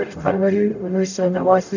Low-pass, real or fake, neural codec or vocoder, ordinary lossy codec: 7.2 kHz; fake; codec, 44.1 kHz, 0.9 kbps, DAC; none